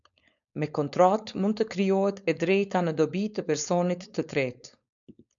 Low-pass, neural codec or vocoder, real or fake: 7.2 kHz; codec, 16 kHz, 4.8 kbps, FACodec; fake